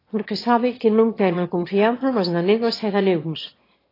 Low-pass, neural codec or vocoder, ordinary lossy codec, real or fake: 5.4 kHz; autoencoder, 22.05 kHz, a latent of 192 numbers a frame, VITS, trained on one speaker; AAC, 24 kbps; fake